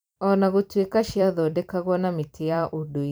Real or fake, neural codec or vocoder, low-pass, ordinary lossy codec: fake; vocoder, 44.1 kHz, 128 mel bands every 256 samples, BigVGAN v2; none; none